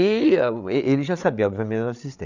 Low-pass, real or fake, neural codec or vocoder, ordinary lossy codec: 7.2 kHz; fake; codec, 16 kHz, 8 kbps, FreqCodec, larger model; none